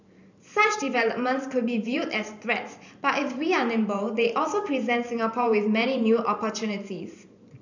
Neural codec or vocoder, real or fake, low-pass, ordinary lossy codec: vocoder, 44.1 kHz, 128 mel bands every 512 samples, BigVGAN v2; fake; 7.2 kHz; none